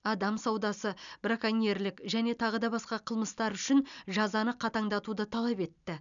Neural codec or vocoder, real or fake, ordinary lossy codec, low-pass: none; real; none; 7.2 kHz